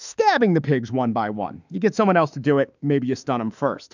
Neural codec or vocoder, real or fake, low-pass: autoencoder, 48 kHz, 32 numbers a frame, DAC-VAE, trained on Japanese speech; fake; 7.2 kHz